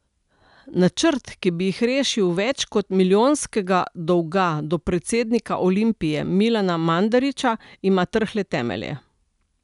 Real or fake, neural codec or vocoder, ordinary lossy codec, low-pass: real; none; none; 10.8 kHz